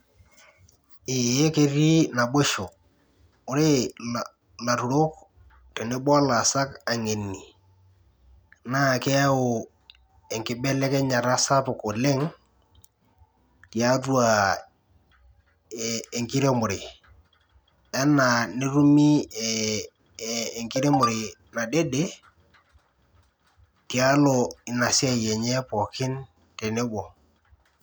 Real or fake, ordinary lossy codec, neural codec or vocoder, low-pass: real; none; none; none